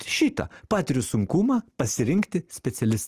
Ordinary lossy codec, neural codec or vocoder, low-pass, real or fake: Opus, 24 kbps; none; 14.4 kHz; real